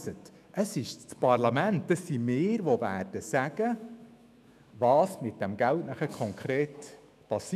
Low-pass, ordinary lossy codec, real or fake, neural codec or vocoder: 14.4 kHz; none; fake; autoencoder, 48 kHz, 128 numbers a frame, DAC-VAE, trained on Japanese speech